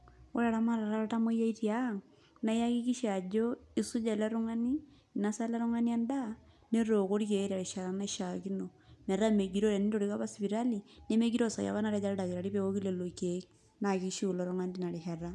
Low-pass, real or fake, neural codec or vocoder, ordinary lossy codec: none; real; none; none